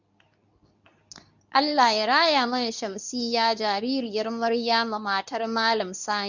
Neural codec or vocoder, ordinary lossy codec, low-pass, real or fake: codec, 24 kHz, 0.9 kbps, WavTokenizer, medium speech release version 2; none; 7.2 kHz; fake